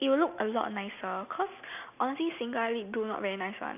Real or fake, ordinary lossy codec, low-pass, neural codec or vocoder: real; none; 3.6 kHz; none